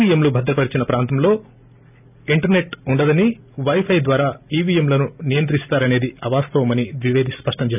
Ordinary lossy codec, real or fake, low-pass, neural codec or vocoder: none; real; 3.6 kHz; none